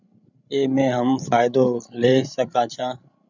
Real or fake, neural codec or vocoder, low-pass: fake; codec, 16 kHz, 16 kbps, FreqCodec, larger model; 7.2 kHz